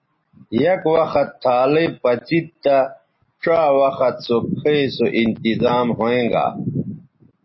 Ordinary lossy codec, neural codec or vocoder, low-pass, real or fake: MP3, 24 kbps; none; 7.2 kHz; real